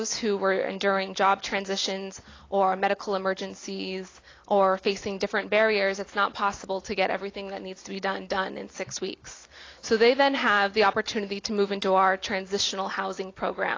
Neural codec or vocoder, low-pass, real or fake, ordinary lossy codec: none; 7.2 kHz; real; AAC, 32 kbps